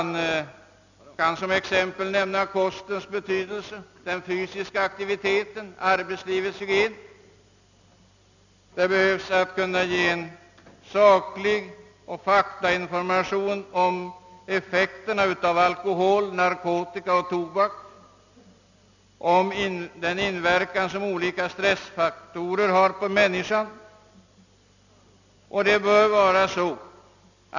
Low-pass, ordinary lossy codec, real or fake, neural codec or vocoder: 7.2 kHz; none; real; none